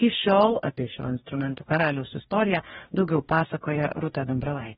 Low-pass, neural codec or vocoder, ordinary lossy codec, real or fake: 19.8 kHz; codec, 44.1 kHz, 2.6 kbps, DAC; AAC, 16 kbps; fake